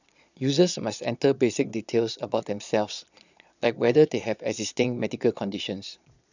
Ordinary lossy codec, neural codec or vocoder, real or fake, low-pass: none; vocoder, 22.05 kHz, 80 mel bands, Vocos; fake; 7.2 kHz